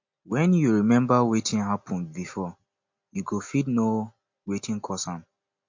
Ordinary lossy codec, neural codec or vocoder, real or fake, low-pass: MP3, 48 kbps; none; real; 7.2 kHz